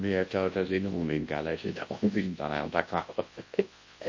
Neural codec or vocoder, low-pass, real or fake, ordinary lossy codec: codec, 24 kHz, 0.9 kbps, WavTokenizer, large speech release; 7.2 kHz; fake; MP3, 32 kbps